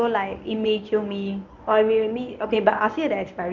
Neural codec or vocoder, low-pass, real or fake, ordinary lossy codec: codec, 24 kHz, 0.9 kbps, WavTokenizer, medium speech release version 1; 7.2 kHz; fake; none